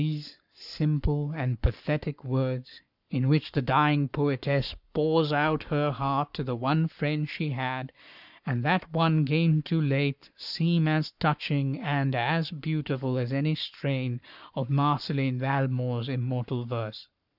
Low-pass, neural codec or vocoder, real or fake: 5.4 kHz; codec, 44.1 kHz, 7.8 kbps, Pupu-Codec; fake